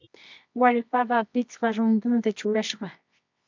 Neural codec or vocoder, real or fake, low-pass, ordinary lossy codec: codec, 24 kHz, 0.9 kbps, WavTokenizer, medium music audio release; fake; 7.2 kHz; MP3, 64 kbps